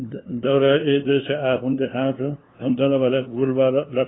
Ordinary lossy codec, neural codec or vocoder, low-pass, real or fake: AAC, 16 kbps; codec, 16 kHz, 2 kbps, FunCodec, trained on LibriTTS, 25 frames a second; 7.2 kHz; fake